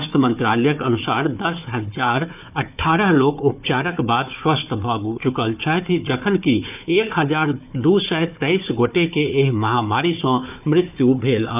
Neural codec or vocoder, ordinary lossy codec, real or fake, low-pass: codec, 16 kHz, 4 kbps, FunCodec, trained on Chinese and English, 50 frames a second; none; fake; 3.6 kHz